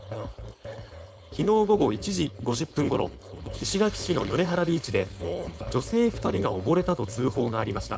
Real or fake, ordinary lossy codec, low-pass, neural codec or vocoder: fake; none; none; codec, 16 kHz, 4.8 kbps, FACodec